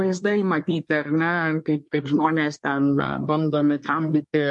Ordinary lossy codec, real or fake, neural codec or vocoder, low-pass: MP3, 48 kbps; fake; codec, 24 kHz, 1 kbps, SNAC; 10.8 kHz